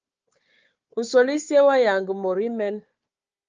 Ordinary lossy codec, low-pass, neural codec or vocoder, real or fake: Opus, 24 kbps; 7.2 kHz; codec, 16 kHz, 4 kbps, FunCodec, trained on Chinese and English, 50 frames a second; fake